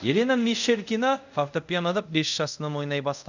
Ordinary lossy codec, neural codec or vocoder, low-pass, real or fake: none; codec, 24 kHz, 0.5 kbps, DualCodec; 7.2 kHz; fake